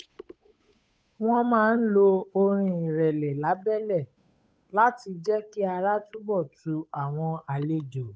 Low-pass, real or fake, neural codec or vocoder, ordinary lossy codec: none; fake; codec, 16 kHz, 8 kbps, FunCodec, trained on Chinese and English, 25 frames a second; none